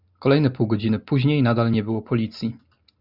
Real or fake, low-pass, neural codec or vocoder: real; 5.4 kHz; none